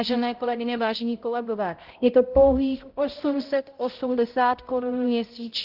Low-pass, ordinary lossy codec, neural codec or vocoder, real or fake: 5.4 kHz; Opus, 16 kbps; codec, 16 kHz, 0.5 kbps, X-Codec, HuBERT features, trained on balanced general audio; fake